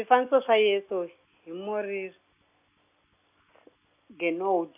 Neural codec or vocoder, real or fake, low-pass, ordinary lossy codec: none; real; 3.6 kHz; none